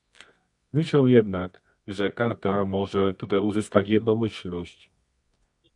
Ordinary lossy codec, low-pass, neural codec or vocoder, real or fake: MP3, 64 kbps; 10.8 kHz; codec, 24 kHz, 0.9 kbps, WavTokenizer, medium music audio release; fake